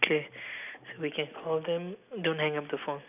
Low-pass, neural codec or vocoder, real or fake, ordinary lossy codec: 3.6 kHz; none; real; none